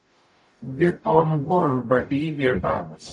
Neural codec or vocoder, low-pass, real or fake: codec, 44.1 kHz, 0.9 kbps, DAC; 10.8 kHz; fake